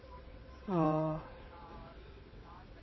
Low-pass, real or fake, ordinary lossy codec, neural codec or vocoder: 7.2 kHz; fake; MP3, 24 kbps; vocoder, 22.05 kHz, 80 mel bands, WaveNeXt